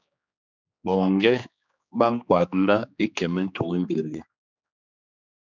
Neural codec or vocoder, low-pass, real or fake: codec, 16 kHz, 2 kbps, X-Codec, HuBERT features, trained on general audio; 7.2 kHz; fake